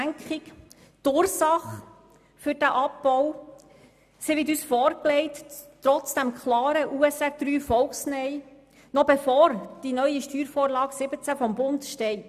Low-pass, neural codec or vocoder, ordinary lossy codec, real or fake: 14.4 kHz; none; none; real